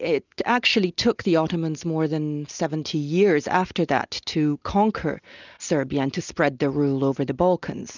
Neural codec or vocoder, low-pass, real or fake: none; 7.2 kHz; real